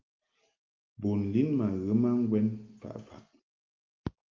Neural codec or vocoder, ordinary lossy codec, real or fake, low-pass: none; Opus, 32 kbps; real; 7.2 kHz